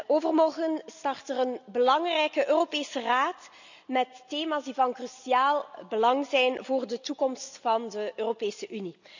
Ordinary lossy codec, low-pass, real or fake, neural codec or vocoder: none; 7.2 kHz; real; none